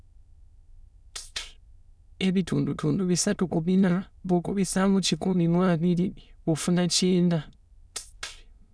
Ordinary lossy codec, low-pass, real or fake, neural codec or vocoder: none; none; fake; autoencoder, 22.05 kHz, a latent of 192 numbers a frame, VITS, trained on many speakers